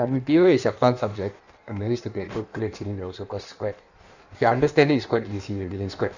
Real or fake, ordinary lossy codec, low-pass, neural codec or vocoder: fake; none; 7.2 kHz; codec, 16 kHz in and 24 kHz out, 1.1 kbps, FireRedTTS-2 codec